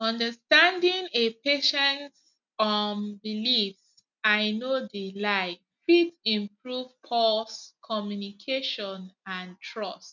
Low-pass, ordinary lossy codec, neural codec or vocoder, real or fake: 7.2 kHz; none; none; real